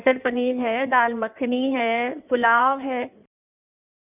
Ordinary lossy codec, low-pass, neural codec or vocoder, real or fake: none; 3.6 kHz; codec, 16 kHz in and 24 kHz out, 1.1 kbps, FireRedTTS-2 codec; fake